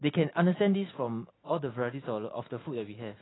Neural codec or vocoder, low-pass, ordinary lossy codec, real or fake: none; 7.2 kHz; AAC, 16 kbps; real